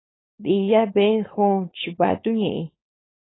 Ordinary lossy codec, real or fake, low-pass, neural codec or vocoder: AAC, 16 kbps; fake; 7.2 kHz; codec, 16 kHz, 4.8 kbps, FACodec